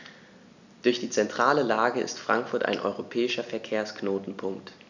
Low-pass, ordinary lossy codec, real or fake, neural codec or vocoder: 7.2 kHz; none; real; none